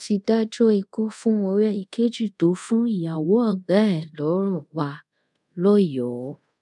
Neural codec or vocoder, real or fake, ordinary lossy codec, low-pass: codec, 24 kHz, 0.5 kbps, DualCodec; fake; none; 10.8 kHz